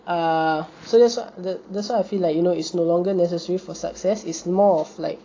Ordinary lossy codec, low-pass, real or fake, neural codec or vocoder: AAC, 32 kbps; 7.2 kHz; real; none